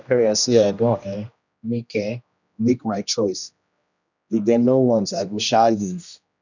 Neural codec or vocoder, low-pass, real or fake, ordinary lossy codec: codec, 16 kHz, 1 kbps, X-Codec, HuBERT features, trained on general audio; 7.2 kHz; fake; none